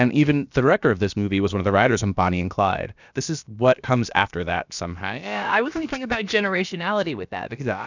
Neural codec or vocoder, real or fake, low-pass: codec, 16 kHz, about 1 kbps, DyCAST, with the encoder's durations; fake; 7.2 kHz